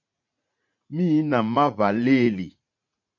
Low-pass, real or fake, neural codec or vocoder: 7.2 kHz; fake; vocoder, 44.1 kHz, 80 mel bands, Vocos